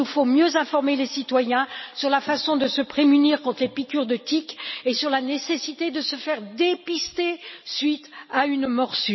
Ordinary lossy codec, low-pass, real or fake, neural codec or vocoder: MP3, 24 kbps; 7.2 kHz; real; none